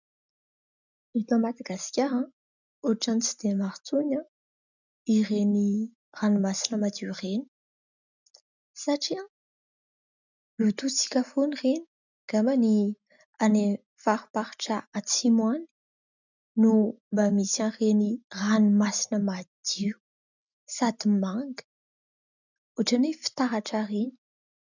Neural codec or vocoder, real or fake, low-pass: none; real; 7.2 kHz